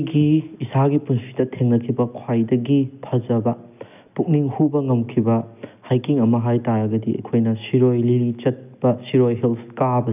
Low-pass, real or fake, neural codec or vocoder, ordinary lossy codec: 3.6 kHz; real; none; none